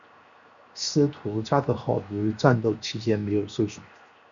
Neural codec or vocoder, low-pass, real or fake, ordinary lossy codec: codec, 16 kHz, 0.7 kbps, FocalCodec; 7.2 kHz; fake; Opus, 64 kbps